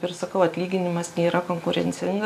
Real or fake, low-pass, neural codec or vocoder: real; 14.4 kHz; none